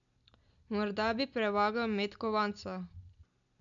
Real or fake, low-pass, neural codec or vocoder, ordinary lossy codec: real; 7.2 kHz; none; none